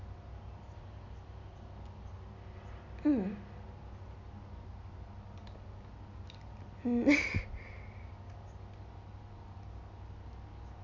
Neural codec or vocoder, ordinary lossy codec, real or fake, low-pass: none; MP3, 64 kbps; real; 7.2 kHz